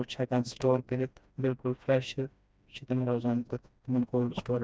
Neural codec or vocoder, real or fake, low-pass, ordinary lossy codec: codec, 16 kHz, 1 kbps, FreqCodec, smaller model; fake; none; none